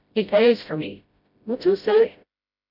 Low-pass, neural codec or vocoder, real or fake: 5.4 kHz; codec, 16 kHz, 0.5 kbps, FreqCodec, smaller model; fake